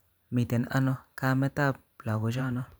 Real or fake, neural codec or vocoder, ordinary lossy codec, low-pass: fake; vocoder, 44.1 kHz, 128 mel bands every 512 samples, BigVGAN v2; none; none